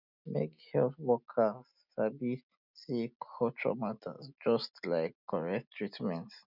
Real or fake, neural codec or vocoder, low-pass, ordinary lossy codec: real; none; 5.4 kHz; none